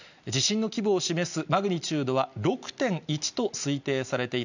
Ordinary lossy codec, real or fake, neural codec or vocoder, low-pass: none; real; none; 7.2 kHz